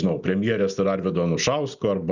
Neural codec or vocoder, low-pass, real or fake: none; 7.2 kHz; real